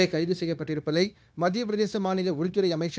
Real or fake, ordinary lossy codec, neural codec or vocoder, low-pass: fake; none; codec, 16 kHz, 0.9 kbps, LongCat-Audio-Codec; none